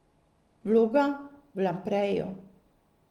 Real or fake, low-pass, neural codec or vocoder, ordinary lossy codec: real; 19.8 kHz; none; Opus, 24 kbps